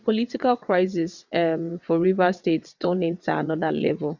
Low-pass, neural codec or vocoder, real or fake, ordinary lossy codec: 7.2 kHz; vocoder, 22.05 kHz, 80 mel bands, WaveNeXt; fake; none